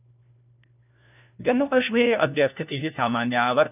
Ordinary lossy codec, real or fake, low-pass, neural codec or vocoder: none; fake; 3.6 kHz; codec, 16 kHz, 1 kbps, FunCodec, trained on LibriTTS, 50 frames a second